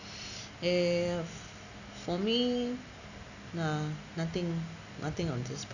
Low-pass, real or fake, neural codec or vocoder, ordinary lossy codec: 7.2 kHz; real; none; none